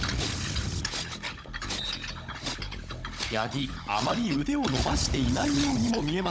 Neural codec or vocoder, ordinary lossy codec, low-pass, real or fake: codec, 16 kHz, 16 kbps, FunCodec, trained on Chinese and English, 50 frames a second; none; none; fake